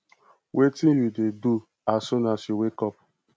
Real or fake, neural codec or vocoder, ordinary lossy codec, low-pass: real; none; none; none